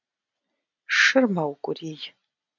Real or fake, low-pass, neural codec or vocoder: real; 7.2 kHz; none